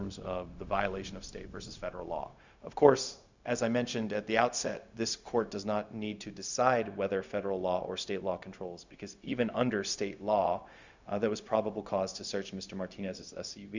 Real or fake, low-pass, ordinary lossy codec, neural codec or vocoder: fake; 7.2 kHz; Opus, 64 kbps; codec, 16 kHz, 0.4 kbps, LongCat-Audio-Codec